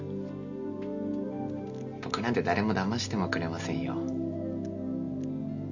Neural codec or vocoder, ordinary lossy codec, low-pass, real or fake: none; none; 7.2 kHz; real